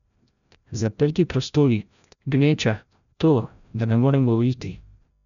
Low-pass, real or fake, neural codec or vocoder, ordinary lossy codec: 7.2 kHz; fake; codec, 16 kHz, 0.5 kbps, FreqCodec, larger model; none